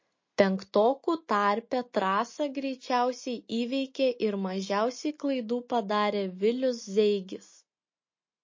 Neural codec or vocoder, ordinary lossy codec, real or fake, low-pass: none; MP3, 32 kbps; real; 7.2 kHz